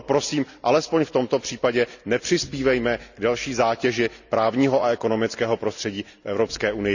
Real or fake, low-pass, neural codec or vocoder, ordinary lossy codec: real; 7.2 kHz; none; none